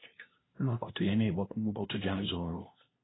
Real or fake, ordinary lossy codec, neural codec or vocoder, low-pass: fake; AAC, 16 kbps; codec, 16 kHz, 0.5 kbps, FunCodec, trained on LibriTTS, 25 frames a second; 7.2 kHz